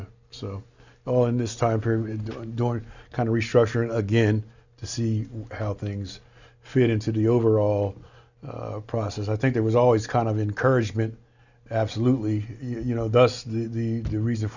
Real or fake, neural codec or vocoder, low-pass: real; none; 7.2 kHz